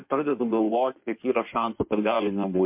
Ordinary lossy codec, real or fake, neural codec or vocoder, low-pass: MP3, 32 kbps; fake; codec, 16 kHz in and 24 kHz out, 1.1 kbps, FireRedTTS-2 codec; 3.6 kHz